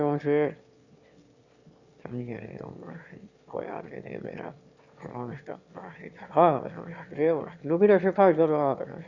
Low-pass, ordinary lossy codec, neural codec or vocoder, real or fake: 7.2 kHz; Opus, 64 kbps; autoencoder, 22.05 kHz, a latent of 192 numbers a frame, VITS, trained on one speaker; fake